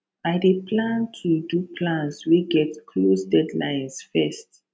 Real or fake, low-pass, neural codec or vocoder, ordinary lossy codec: real; none; none; none